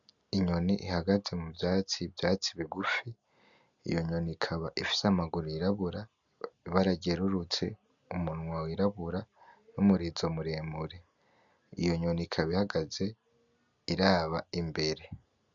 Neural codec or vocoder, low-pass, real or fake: none; 7.2 kHz; real